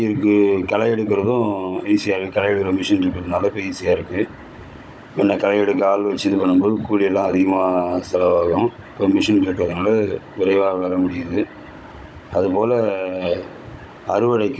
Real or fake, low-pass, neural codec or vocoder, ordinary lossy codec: fake; none; codec, 16 kHz, 16 kbps, FunCodec, trained on Chinese and English, 50 frames a second; none